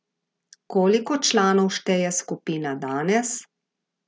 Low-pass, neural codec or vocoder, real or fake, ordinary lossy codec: none; none; real; none